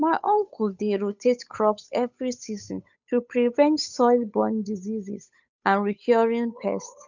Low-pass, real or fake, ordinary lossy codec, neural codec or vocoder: 7.2 kHz; fake; none; codec, 16 kHz, 8 kbps, FunCodec, trained on Chinese and English, 25 frames a second